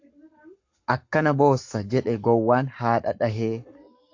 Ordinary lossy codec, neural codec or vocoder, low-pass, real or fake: AAC, 48 kbps; codec, 44.1 kHz, 7.8 kbps, Pupu-Codec; 7.2 kHz; fake